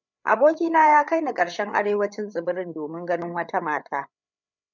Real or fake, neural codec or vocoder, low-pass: fake; codec, 16 kHz, 8 kbps, FreqCodec, larger model; 7.2 kHz